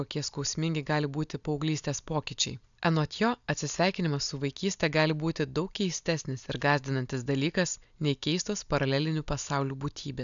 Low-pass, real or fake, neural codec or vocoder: 7.2 kHz; real; none